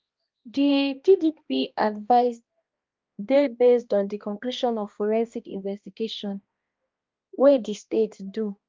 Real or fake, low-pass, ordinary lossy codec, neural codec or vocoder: fake; 7.2 kHz; Opus, 24 kbps; codec, 16 kHz, 1 kbps, X-Codec, HuBERT features, trained on balanced general audio